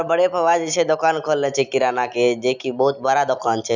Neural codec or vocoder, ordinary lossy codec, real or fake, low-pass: none; none; real; 7.2 kHz